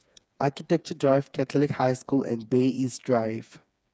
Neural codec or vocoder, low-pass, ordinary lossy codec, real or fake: codec, 16 kHz, 4 kbps, FreqCodec, smaller model; none; none; fake